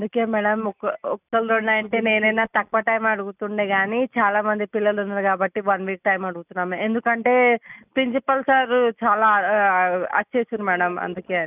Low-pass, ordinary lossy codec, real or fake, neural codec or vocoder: 3.6 kHz; none; real; none